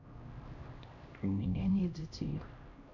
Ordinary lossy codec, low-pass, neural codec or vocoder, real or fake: MP3, 48 kbps; 7.2 kHz; codec, 16 kHz, 1 kbps, X-Codec, HuBERT features, trained on LibriSpeech; fake